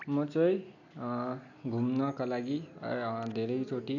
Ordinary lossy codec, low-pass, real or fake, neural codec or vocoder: none; 7.2 kHz; real; none